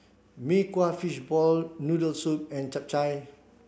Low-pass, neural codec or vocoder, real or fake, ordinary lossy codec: none; none; real; none